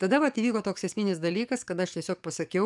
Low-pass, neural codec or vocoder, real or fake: 10.8 kHz; codec, 44.1 kHz, 7.8 kbps, DAC; fake